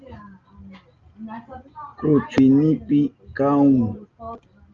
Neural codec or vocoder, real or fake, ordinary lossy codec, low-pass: none; real; Opus, 24 kbps; 7.2 kHz